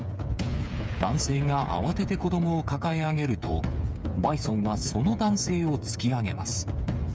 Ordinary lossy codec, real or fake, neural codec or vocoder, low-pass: none; fake; codec, 16 kHz, 8 kbps, FreqCodec, smaller model; none